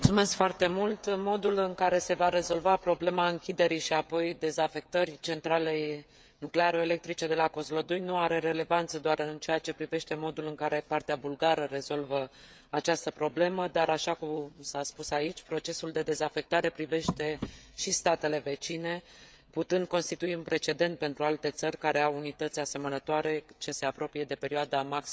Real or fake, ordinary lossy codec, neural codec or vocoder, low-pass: fake; none; codec, 16 kHz, 16 kbps, FreqCodec, smaller model; none